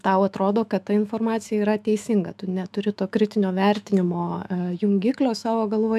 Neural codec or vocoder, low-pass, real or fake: autoencoder, 48 kHz, 128 numbers a frame, DAC-VAE, trained on Japanese speech; 14.4 kHz; fake